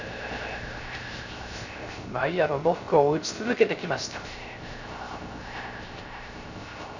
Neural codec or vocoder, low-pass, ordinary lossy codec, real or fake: codec, 16 kHz, 0.3 kbps, FocalCodec; 7.2 kHz; none; fake